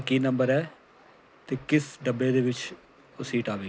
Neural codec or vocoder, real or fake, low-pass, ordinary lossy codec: none; real; none; none